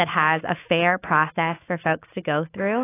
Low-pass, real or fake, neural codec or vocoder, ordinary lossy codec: 3.6 kHz; fake; autoencoder, 48 kHz, 128 numbers a frame, DAC-VAE, trained on Japanese speech; AAC, 24 kbps